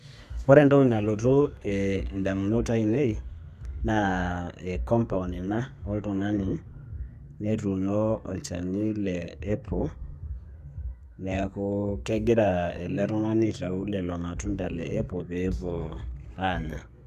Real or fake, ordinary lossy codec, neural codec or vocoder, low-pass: fake; none; codec, 32 kHz, 1.9 kbps, SNAC; 14.4 kHz